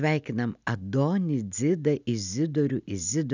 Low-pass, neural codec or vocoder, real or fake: 7.2 kHz; none; real